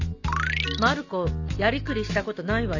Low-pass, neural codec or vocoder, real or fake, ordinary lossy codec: 7.2 kHz; none; real; none